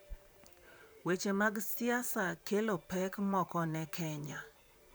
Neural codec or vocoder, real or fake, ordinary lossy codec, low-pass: vocoder, 44.1 kHz, 128 mel bands, Pupu-Vocoder; fake; none; none